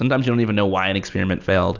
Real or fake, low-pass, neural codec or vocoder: real; 7.2 kHz; none